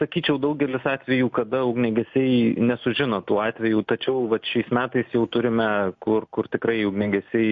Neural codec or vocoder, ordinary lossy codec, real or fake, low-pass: none; MP3, 48 kbps; real; 7.2 kHz